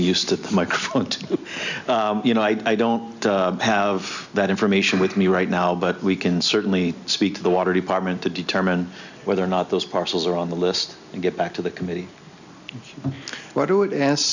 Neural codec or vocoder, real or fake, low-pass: none; real; 7.2 kHz